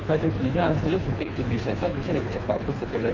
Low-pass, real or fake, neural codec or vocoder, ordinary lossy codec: 7.2 kHz; fake; codec, 24 kHz, 3 kbps, HILCodec; none